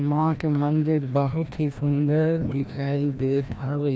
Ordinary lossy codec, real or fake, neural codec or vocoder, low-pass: none; fake; codec, 16 kHz, 1 kbps, FreqCodec, larger model; none